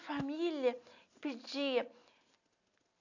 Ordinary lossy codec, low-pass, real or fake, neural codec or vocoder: none; 7.2 kHz; real; none